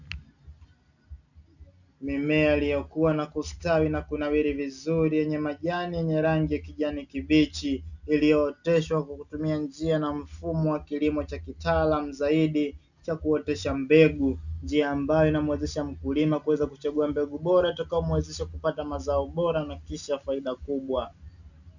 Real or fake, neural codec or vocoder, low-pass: real; none; 7.2 kHz